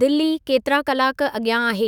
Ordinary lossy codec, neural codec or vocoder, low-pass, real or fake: none; autoencoder, 48 kHz, 128 numbers a frame, DAC-VAE, trained on Japanese speech; 19.8 kHz; fake